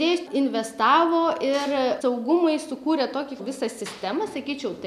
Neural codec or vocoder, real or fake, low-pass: none; real; 14.4 kHz